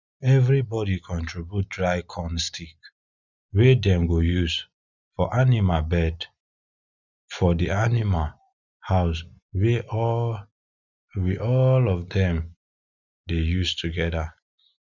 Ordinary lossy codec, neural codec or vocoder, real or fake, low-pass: none; none; real; 7.2 kHz